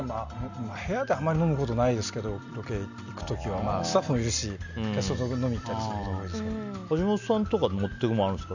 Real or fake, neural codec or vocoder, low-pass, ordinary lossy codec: real; none; 7.2 kHz; none